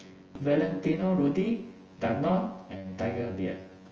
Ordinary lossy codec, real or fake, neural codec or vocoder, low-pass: Opus, 24 kbps; fake; vocoder, 24 kHz, 100 mel bands, Vocos; 7.2 kHz